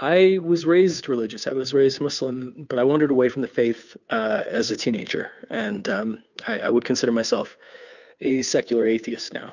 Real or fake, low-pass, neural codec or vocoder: fake; 7.2 kHz; codec, 16 kHz, 2 kbps, FunCodec, trained on Chinese and English, 25 frames a second